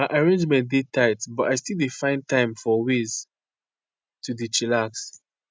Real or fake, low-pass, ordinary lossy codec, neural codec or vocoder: real; none; none; none